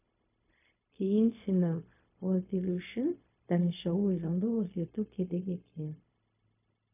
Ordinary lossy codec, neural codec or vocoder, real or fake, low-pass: AAC, 32 kbps; codec, 16 kHz, 0.4 kbps, LongCat-Audio-Codec; fake; 3.6 kHz